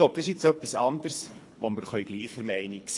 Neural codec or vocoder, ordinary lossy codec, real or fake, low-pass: codec, 24 kHz, 3 kbps, HILCodec; AAC, 48 kbps; fake; 10.8 kHz